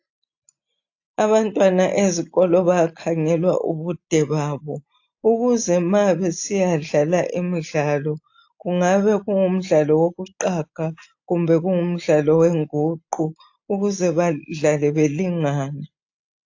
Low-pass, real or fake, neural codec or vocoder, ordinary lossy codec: 7.2 kHz; real; none; AAC, 48 kbps